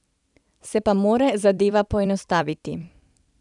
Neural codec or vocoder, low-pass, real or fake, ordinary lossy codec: vocoder, 44.1 kHz, 128 mel bands every 256 samples, BigVGAN v2; 10.8 kHz; fake; none